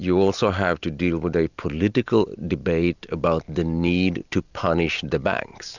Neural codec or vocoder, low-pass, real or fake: none; 7.2 kHz; real